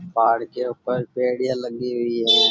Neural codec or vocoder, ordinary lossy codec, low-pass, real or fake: none; none; none; real